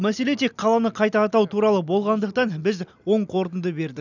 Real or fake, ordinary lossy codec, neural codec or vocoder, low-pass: real; none; none; 7.2 kHz